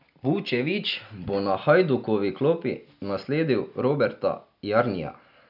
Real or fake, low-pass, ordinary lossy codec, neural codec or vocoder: real; 5.4 kHz; none; none